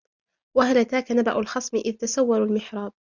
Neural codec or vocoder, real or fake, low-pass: none; real; 7.2 kHz